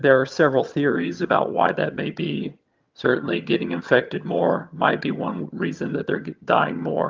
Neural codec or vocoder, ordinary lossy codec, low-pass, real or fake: vocoder, 22.05 kHz, 80 mel bands, HiFi-GAN; Opus, 24 kbps; 7.2 kHz; fake